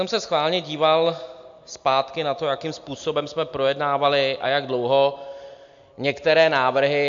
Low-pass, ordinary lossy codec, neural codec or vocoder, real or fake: 7.2 kHz; AAC, 64 kbps; none; real